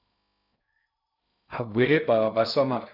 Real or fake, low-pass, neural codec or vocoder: fake; 5.4 kHz; codec, 16 kHz in and 24 kHz out, 0.6 kbps, FocalCodec, streaming, 2048 codes